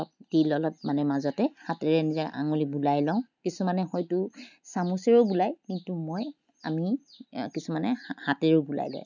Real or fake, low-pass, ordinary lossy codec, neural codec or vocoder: real; 7.2 kHz; none; none